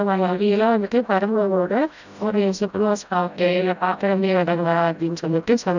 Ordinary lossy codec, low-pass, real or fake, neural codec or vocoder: none; 7.2 kHz; fake; codec, 16 kHz, 0.5 kbps, FreqCodec, smaller model